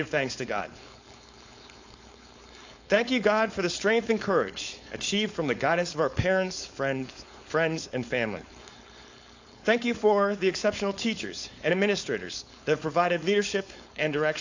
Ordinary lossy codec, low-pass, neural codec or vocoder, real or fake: AAC, 48 kbps; 7.2 kHz; codec, 16 kHz, 4.8 kbps, FACodec; fake